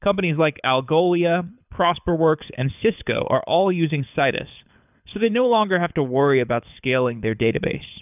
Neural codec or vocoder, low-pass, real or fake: codec, 24 kHz, 6 kbps, HILCodec; 3.6 kHz; fake